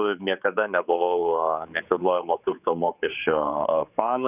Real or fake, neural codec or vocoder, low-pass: fake; codec, 16 kHz, 4 kbps, X-Codec, HuBERT features, trained on general audio; 3.6 kHz